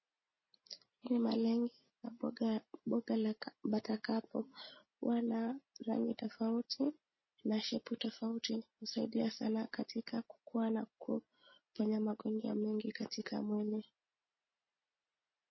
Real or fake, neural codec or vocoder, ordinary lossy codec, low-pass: real; none; MP3, 24 kbps; 7.2 kHz